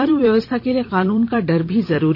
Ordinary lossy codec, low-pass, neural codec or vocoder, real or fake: none; 5.4 kHz; vocoder, 44.1 kHz, 128 mel bands every 256 samples, BigVGAN v2; fake